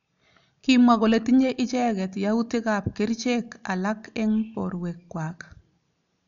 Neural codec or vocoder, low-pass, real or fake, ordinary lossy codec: none; 7.2 kHz; real; none